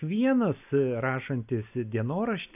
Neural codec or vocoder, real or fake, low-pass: none; real; 3.6 kHz